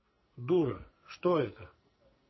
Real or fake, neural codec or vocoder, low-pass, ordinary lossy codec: fake; codec, 44.1 kHz, 2.6 kbps, SNAC; 7.2 kHz; MP3, 24 kbps